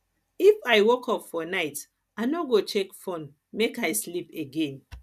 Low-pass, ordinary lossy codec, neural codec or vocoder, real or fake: 14.4 kHz; none; none; real